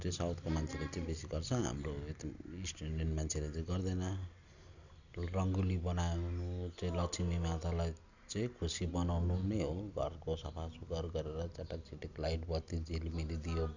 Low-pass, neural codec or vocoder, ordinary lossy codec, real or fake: 7.2 kHz; none; none; real